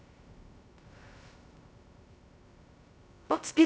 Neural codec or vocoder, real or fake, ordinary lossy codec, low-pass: codec, 16 kHz, 0.2 kbps, FocalCodec; fake; none; none